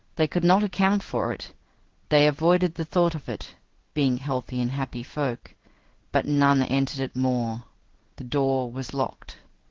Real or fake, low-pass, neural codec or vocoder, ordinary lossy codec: fake; 7.2 kHz; codec, 16 kHz in and 24 kHz out, 1 kbps, XY-Tokenizer; Opus, 16 kbps